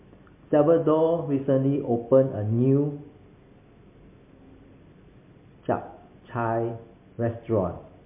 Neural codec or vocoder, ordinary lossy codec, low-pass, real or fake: none; MP3, 24 kbps; 3.6 kHz; real